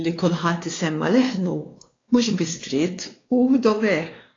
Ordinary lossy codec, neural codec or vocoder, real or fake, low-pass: AAC, 32 kbps; codec, 16 kHz, 2 kbps, X-Codec, WavLM features, trained on Multilingual LibriSpeech; fake; 7.2 kHz